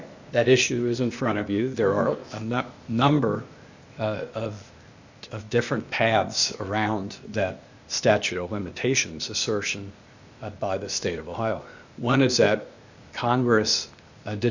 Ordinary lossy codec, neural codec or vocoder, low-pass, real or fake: Opus, 64 kbps; codec, 16 kHz, 0.8 kbps, ZipCodec; 7.2 kHz; fake